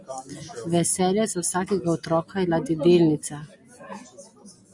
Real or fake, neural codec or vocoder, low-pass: real; none; 10.8 kHz